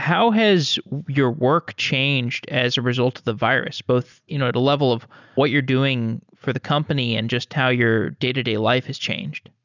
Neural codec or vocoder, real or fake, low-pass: none; real; 7.2 kHz